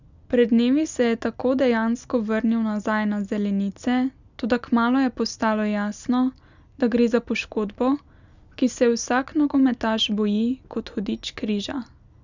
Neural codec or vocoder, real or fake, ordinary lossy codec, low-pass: none; real; none; 7.2 kHz